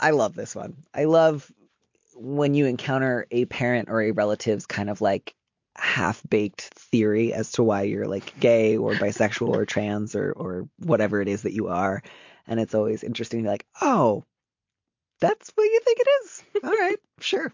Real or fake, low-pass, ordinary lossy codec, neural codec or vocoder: real; 7.2 kHz; MP3, 48 kbps; none